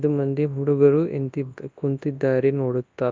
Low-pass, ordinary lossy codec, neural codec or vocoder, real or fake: 7.2 kHz; Opus, 24 kbps; codec, 24 kHz, 0.9 kbps, WavTokenizer, large speech release; fake